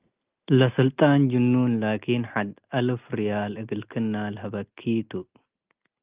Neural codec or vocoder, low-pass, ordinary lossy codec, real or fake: none; 3.6 kHz; Opus, 24 kbps; real